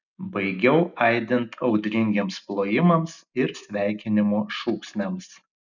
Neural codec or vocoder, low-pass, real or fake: none; 7.2 kHz; real